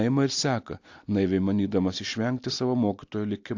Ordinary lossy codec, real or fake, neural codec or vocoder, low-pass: AAC, 48 kbps; real; none; 7.2 kHz